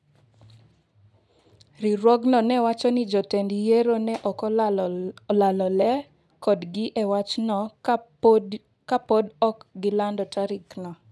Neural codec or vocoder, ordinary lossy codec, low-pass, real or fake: none; none; none; real